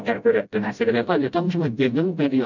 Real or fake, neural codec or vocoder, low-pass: fake; codec, 16 kHz, 0.5 kbps, FreqCodec, smaller model; 7.2 kHz